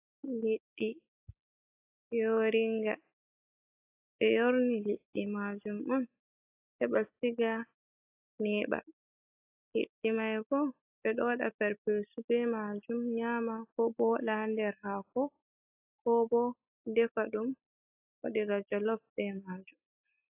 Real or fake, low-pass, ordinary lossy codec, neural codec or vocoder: real; 3.6 kHz; AAC, 32 kbps; none